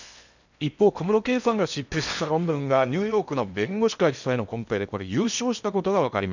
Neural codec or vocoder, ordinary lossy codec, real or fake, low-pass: codec, 16 kHz in and 24 kHz out, 0.8 kbps, FocalCodec, streaming, 65536 codes; none; fake; 7.2 kHz